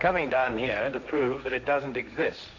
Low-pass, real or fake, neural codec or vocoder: 7.2 kHz; fake; codec, 16 kHz, 1.1 kbps, Voila-Tokenizer